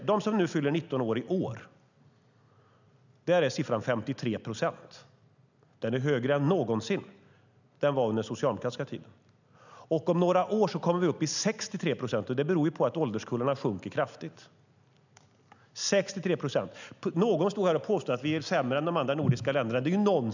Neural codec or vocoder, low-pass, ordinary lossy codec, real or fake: none; 7.2 kHz; none; real